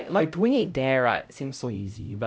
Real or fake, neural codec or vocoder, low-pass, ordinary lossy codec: fake; codec, 16 kHz, 0.5 kbps, X-Codec, HuBERT features, trained on LibriSpeech; none; none